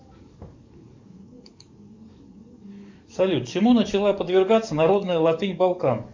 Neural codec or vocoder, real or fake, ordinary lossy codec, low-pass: codec, 44.1 kHz, 7.8 kbps, DAC; fake; MP3, 64 kbps; 7.2 kHz